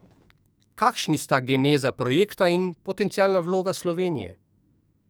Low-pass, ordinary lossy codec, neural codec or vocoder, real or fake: none; none; codec, 44.1 kHz, 2.6 kbps, SNAC; fake